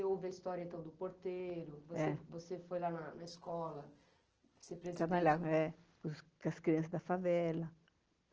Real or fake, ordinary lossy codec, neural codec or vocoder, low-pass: real; Opus, 16 kbps; none; 7.2 kHz